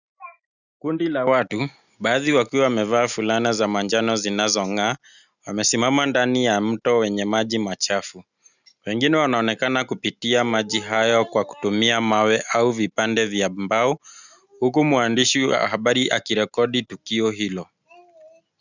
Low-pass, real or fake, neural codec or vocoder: 7.2 kHz; real; none